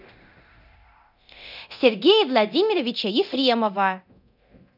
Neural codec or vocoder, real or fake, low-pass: codec, 24 kHz, 0.9 kbps, DualCodec; fake; 5.4 kHz